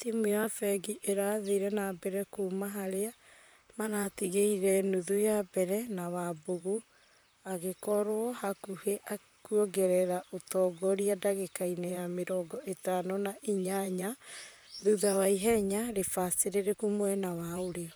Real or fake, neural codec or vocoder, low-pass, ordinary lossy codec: fake; vocoder, 44.1 kHz, 128 mel bands, Pupu-Vocoder; none; none